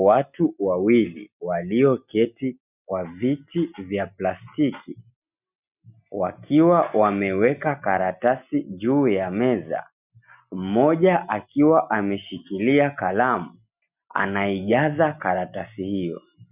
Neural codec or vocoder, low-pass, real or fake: none; 3.6 kHz; real